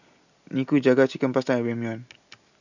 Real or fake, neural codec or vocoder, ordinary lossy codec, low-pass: real; none; none; 7.2 kHz